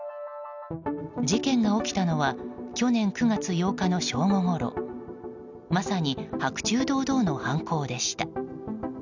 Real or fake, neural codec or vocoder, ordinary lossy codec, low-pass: real; none; none; 7.2 kHz